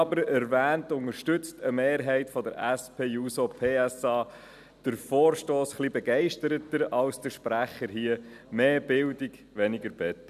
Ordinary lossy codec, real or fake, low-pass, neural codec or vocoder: none; real; 14.4 kHz; none